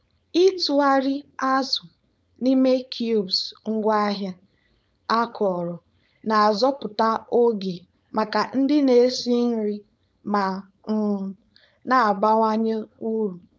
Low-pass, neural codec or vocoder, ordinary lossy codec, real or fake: none; codec, 16 kHz, 4.8 kbps, FACodec; none; fake